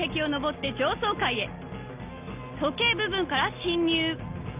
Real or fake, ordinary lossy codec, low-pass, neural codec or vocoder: real; Opus, 24 kbps; 3.6 kHz; none